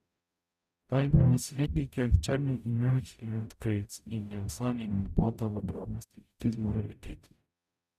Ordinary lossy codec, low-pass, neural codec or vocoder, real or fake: none; 14.4 kHz; codec, 44.1 kHz, 0.9 kbps, DAC; fake